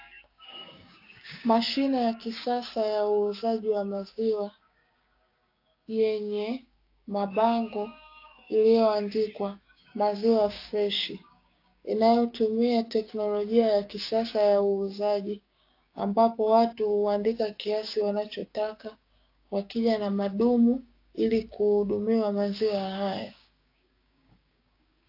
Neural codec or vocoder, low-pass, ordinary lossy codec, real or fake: codec, 16 kHz, 6 kbps, DAC; 5.4 kHz; AAC, 32 kbps; fake